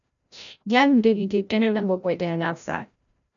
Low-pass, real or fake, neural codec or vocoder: 7.2 kHz; fake; codec, 16 kHz, 0.5 kbps, FreqCodec, larger model